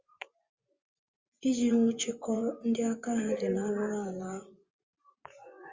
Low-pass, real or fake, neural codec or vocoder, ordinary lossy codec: 7.2 kHz; fake; vocoder, 24 kHz, 100 mel bands, Vocos; Opus, 32 kbps